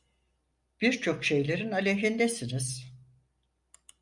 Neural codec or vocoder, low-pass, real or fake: none; 10.8 kHz; real